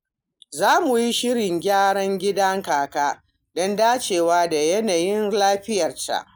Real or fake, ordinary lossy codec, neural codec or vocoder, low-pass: real; none; none; none